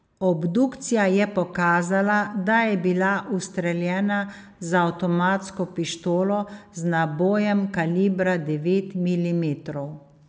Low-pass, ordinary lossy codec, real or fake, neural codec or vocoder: none; none; real; none